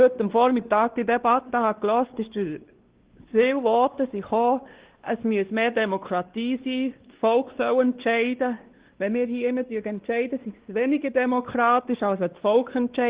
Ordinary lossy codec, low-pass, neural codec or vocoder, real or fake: Opus, 16 kbps; 3.6 kHz; codec, 16 kHz, 2 kbps, X-Codec, WavLM features, trained on Multilingual LibriSpeech; fake